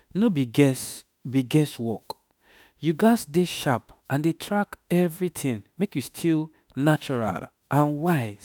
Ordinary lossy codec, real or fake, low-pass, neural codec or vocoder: none; fake; none; autoencoder, 48 kHz, 32 numbers a frame, DAC-VAE, trained on Japanese speech